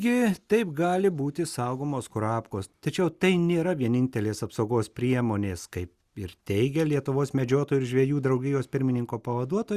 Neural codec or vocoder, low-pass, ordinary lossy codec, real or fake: none; 14.4 kHz; Opus, 64 kbps; real